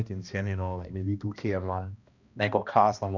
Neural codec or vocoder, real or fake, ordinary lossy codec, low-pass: codec, 16 kHz, 1 kbps, X-Codec, HuBERT features, trained on balanced general audio; fake; none; 7.2 kHz